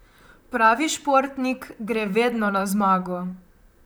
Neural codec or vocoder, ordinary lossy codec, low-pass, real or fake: vocoder, 44.1 kHz, 128 mel bands, Pupu-Vocoder; none; none; fake